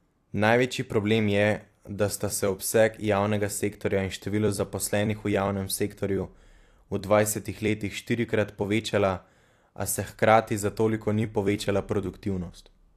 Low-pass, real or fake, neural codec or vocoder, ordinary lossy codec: 14.4 kHz; fake; vocoder, 44.1 kHz, 128 mel bands every 256 samples, BigVGAN v2; AAC, 64 kbps